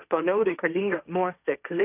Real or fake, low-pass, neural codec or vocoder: fake; 3.6 kHz; codec, 16 kHz, 1.1 kbps, Voila-Tokenizer